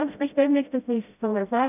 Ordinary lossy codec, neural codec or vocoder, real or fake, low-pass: none; codec, 16 kHz, 0.5 kbps, FreqCodec, smaller model; fake; 3.6 kHz